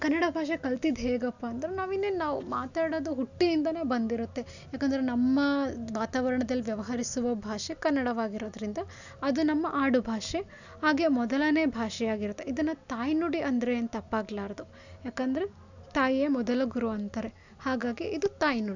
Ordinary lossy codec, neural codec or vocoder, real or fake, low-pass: none; none; real; 7.2 kHz